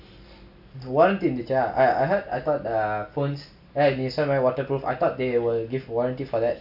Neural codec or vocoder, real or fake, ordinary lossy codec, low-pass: none; real; AAC, 48 kbps; 5.4 kHz